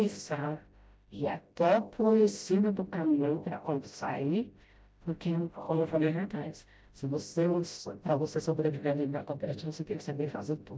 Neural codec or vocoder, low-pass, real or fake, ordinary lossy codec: codec, 16 kHz, 0.5 kbps, FreqCodec, smaller model; none; fake; none